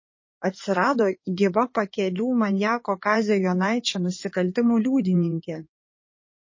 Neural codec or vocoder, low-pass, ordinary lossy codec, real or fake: codec, 16 kHz in and 24 kHz out, 2.2 kbps, FireRedTTS-2 codec; 7.2 kHz; MP3, 32 kbps; fake